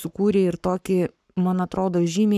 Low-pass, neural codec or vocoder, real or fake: 14.4 kHz; codec, 44.1 kHz, 7.8 kbps, Pupu-Codec; fake